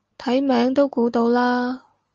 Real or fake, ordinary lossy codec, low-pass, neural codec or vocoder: real; Opus, 24 kbps; 7.2 kHz; none